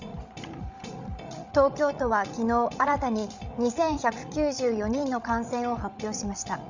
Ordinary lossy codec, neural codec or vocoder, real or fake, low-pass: none; codec, 16 kHz, 16 kbps, FreqCodec, larger model; fake; 7.2 kHz